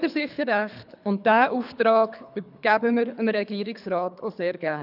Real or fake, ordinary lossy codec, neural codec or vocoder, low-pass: fake; none; codec, 24 kHz, 3 kbps, HILCodec; 5.4 kHz